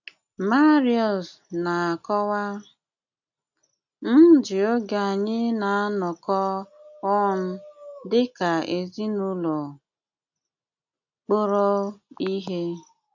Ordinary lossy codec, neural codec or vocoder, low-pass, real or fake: none; none; 7.2 kHz; real